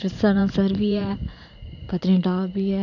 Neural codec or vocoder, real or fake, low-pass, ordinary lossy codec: vocoder, 44.1 kHz, 128 mel bands every 512 samples, BigVGAN v2; fake; 7.2 kHz; none